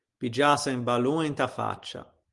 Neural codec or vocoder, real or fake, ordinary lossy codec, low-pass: none; real; Opus, 24 kbps; 10.8 kHz